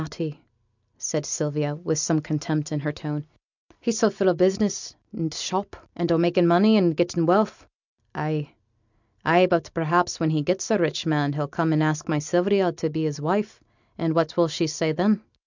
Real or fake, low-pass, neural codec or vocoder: real; 7.2 kHz; none